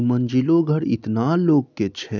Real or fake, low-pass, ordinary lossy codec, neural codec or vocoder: real; 7.2 kHz; none; none